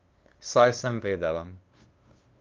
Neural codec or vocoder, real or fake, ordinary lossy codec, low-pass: codec, 16 kHz, 2 kbps, FunCodec, trained on LibriTTS, 25 frames a second; fake; Opus, 24 kbps; 7.2 kHz